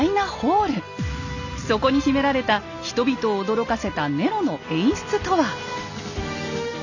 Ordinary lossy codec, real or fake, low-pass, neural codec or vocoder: none; real; 7.2 kHz; none